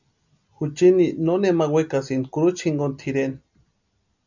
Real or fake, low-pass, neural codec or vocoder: real; 7.2 kHz; none